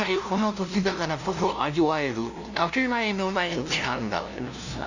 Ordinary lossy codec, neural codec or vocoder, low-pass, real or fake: none; codec, 16 kHz, 0.5 kbps, FunCodec, trained on LibriTTS, 25 frames a second; 7.2 kHz; fake